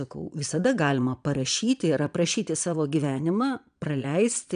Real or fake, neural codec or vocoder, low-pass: fake; vocoder, 22.05 kHz, 80 mel bands, Vocos; 9.9 kHz